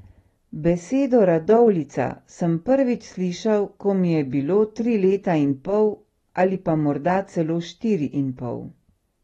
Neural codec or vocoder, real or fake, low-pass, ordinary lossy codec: none; real; 19.8 kHz; AAC, 32 kbps